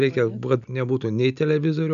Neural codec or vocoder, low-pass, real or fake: none; 7.2 kHz; real